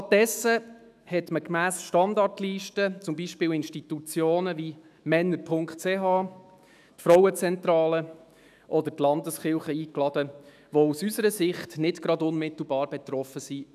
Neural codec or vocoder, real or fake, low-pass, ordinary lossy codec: autoencoder, 48 kHz, 128 numbers a frame, DAC-VAE, trained on Japanese speech; fake; 14.4 kHz; none